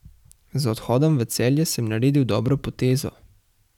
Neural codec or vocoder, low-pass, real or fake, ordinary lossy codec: vocoder, 44.1 kHz, 128 mel bands every 512 samples, BigVGAN v2; 19.8 kHz; fake; none